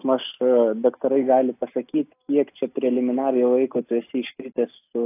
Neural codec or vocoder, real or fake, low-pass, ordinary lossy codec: none; real; 3.6 kHz; AAC, 24 kbps